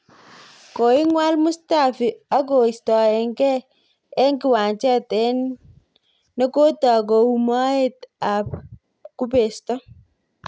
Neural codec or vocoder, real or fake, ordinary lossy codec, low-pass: none; real; none; none